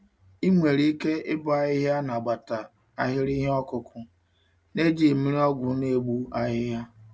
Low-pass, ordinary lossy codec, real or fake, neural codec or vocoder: none; none; real; none